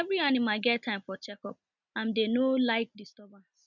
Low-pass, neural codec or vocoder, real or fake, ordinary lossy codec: 7.2 kHz; none; real; none